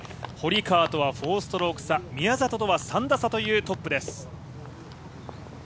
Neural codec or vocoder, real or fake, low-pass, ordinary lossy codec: none; real; none; none